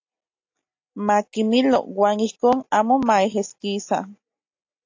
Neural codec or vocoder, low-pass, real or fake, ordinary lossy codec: none; 7.2 kHz; real; AAC, 48 kbps